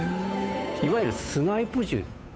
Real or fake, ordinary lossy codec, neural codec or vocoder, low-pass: fake; none; codec, 16 kHz, 8 kbps, FunCodec, trained on Chinese and English, 25 frames a second; none